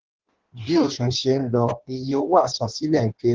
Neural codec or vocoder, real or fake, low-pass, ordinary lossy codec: codec, 16 kHz in and 24 kHz out, 1.1 kbps, FireRedTTS-2 codec; fake; 7.2 kHz; Opus, 16 kbps